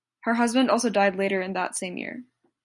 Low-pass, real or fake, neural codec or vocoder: 10.8 kHz; real; none